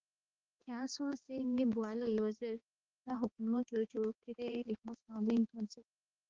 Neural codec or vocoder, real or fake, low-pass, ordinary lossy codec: codec, 16 kHz, 2 kbps, X-Codec, HuBERT features, trained on balanced general audio; fake; 7.2 kHz; Opus, 16 kbps